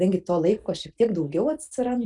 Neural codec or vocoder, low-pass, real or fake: none; 10.8 kHz; real